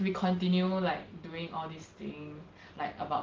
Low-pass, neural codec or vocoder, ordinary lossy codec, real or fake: 7.2 kHz; none; Opus, 16 kbps; real